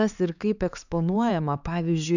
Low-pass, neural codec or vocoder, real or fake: 7.2 kHz; codec, 16 kHz, 4 kbps, X-Codec, HuBERT features, trained on LibriSpeech; fake